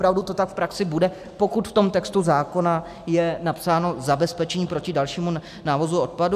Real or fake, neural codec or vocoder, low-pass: real; none; 14.4 kHz